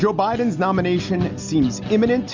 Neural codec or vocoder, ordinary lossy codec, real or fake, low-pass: none; MP3, 64 kbps; real; 7.2 kHz